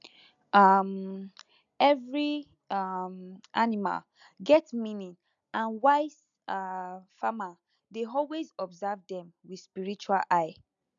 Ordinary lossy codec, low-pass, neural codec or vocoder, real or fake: MP3, 96 kbps; 7.2 kHz; none; real